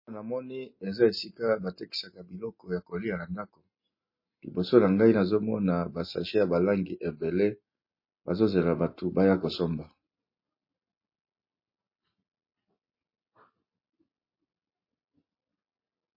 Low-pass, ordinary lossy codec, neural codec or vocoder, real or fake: 5.4 kHz; MP3, 24 kbps; none; real